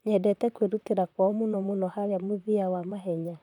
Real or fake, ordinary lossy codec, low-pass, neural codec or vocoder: fake; none; 19.8 kHz; vocoder, 44.1 kHz, 128 mel bands, Pupu-Vocoder